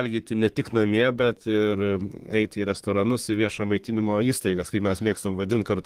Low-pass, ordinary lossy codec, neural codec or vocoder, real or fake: 14.4 kHz; Opus, 24 kbps; codec, 32 kHz, 1.9 kbps, SNAC; fake